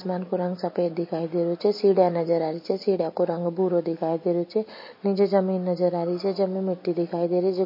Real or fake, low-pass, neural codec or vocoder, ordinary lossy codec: real; 5.4 kHz; none; MP3, 24 kbps